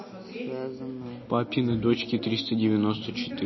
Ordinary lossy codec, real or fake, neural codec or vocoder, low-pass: MP3, 24 kbps; real; none; 7.2 kHz